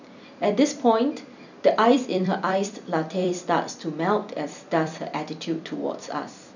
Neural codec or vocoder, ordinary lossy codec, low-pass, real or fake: vocoder, 44.1 kHz, 128 mel bands every 256 samples, BigVGAN v2; none; 7.2 kHz; fake